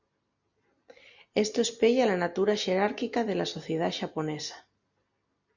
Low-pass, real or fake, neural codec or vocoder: 7.2 kHz; real; none